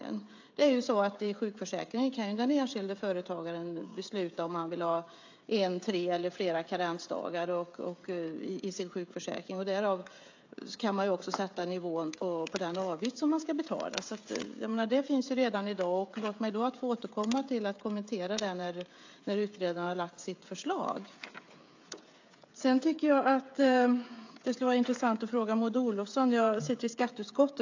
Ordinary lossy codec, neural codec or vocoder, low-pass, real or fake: AAC, 48 kbps; codec, 16 kHz, 16 kbps, FreqCodec, smaller model; 7.2 kHz; fake